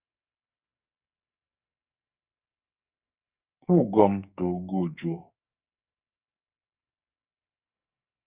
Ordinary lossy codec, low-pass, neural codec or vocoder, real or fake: Opus, 64 kbps; 3.6 kHz; codec, 44.1 kHz, 2.6 kbps, SNAC; fake